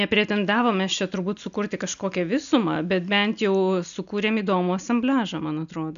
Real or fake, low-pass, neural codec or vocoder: real; 7.2 kHz; none